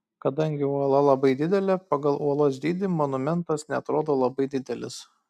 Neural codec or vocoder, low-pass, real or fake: none; 14.4 kHz; real